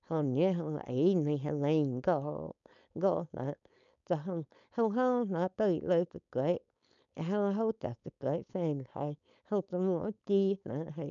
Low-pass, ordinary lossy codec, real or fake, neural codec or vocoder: 7.2 kHz; none; fake; codec, 16 kHz, 4.8 kbps, FACodec